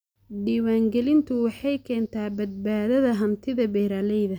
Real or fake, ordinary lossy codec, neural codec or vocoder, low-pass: real; none; none; none